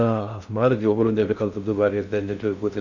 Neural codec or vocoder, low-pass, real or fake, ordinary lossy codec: codec, 16 kHz in and 24 kHz out, 0.6 kbps, FocalCodec, streaming, 2048 codes; 7.2 kHz; fake; none